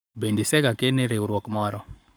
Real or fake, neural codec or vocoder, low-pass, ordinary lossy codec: fake; codec, 44.1 kHz, 7.8 kbps, Pupu-Codec; none; none